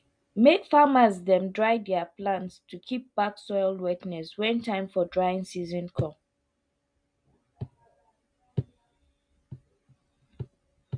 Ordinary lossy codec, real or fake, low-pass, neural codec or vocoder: MP3, 64 kbps; real; 9.9 kHz; none